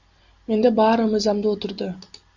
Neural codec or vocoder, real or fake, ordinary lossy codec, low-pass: none; real; Opus, 64 kbps; 7.2 kHz